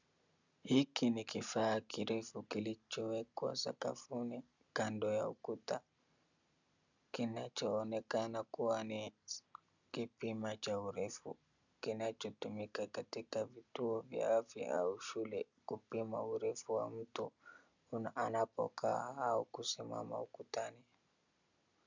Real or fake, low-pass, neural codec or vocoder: real; 7.2 kHz; none